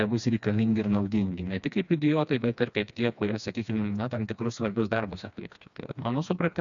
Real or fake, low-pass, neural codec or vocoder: fake; 7.2 kHz; codec, 16 kHz, 2 kbps, FreqCodec, smaller model